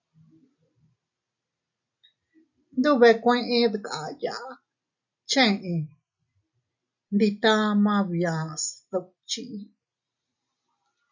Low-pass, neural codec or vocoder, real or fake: 7.2 kHz; none; real